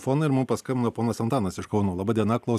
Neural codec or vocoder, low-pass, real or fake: none; 14.4 kHz; real